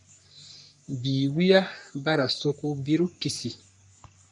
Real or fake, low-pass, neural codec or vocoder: fake; 10.8 kHz; codec, 44.1 kHz, 3.4 kbps, Pupu-Codec